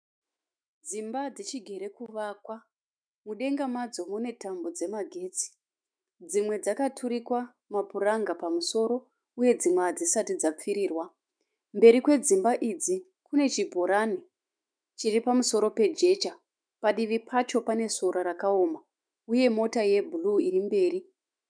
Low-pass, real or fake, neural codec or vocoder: 9.9 kHz; fake; autoencoder, 48 kHz, 128 numbers a frame, DAC-VAE, trained on Japanese speech